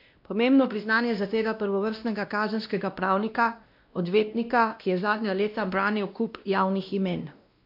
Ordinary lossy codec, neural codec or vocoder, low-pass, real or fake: MP3, 48 kbps; codec, 16 kHz, 1 kbps, X-Codec, WavLM features, trained on Multilingual LibriSpeech; 5.4 kHz; fake